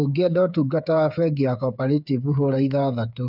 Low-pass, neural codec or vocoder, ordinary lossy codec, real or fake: 5.4 kHz; codec, 16 kHz, 8 kbps, FreqCodec, smaller model; none; fake